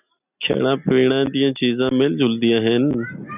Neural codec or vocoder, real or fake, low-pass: none; real; 3.6 kHz